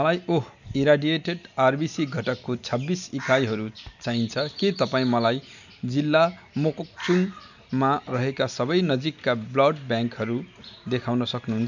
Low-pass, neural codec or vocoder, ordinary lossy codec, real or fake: 7.2 kHz; none; none; real